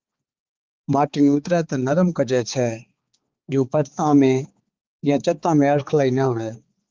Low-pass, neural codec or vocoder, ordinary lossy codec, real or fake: 7.2 kHz; codec, 16 kHz, 4 kbps, X-Codec, HuBERT features, trained on balanced general audio; Opus, 24 kbps; fake